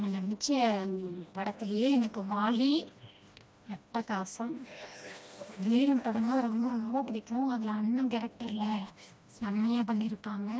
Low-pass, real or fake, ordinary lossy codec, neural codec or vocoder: none; fake; none; codec, 16 kHz, 1 kbps, FreqCodec, smaller model